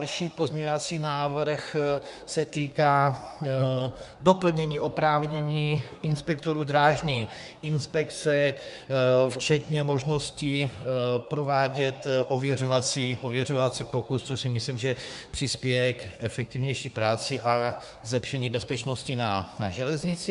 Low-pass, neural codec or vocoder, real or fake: 10.8 kHz; codec, 24 kHz, 1 kbps, SNAC; fake